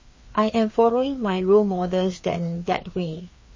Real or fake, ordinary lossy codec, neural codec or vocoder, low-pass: fake; MP3, 32 kbps; codec, 16 kHz, 2 kbps, FreqCodec, larger model; 7.2 kHz